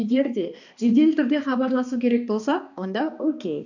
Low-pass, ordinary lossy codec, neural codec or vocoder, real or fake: 7.2 kHz; none; codec, 16 kHz, 2 kbps, X-Codec, HuBERT features, trained on balanced general audio; fake